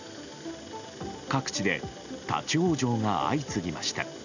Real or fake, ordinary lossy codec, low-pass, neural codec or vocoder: real; none; 7.2 kHz; none